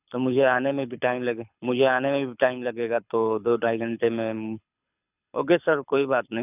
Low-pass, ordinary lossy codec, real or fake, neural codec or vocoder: 3.6 kHz; none; fake; codec, 24 kHz, 6 kbps, HILCodec